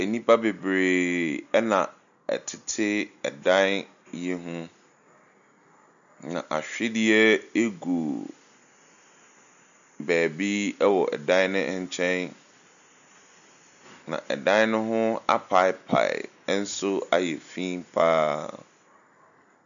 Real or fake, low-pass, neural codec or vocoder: real; 7.2 kHz; none